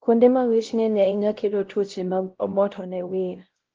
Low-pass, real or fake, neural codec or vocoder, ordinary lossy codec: 7.2 kHz; fake; codec, 16 kHz, 0.5 kbps, X-Codec, WavLM features, trained on Multilingual LibriSpeech; Opus, 16 kbps